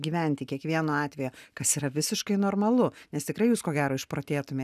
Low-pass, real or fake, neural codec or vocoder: 14.4 kHz; real; none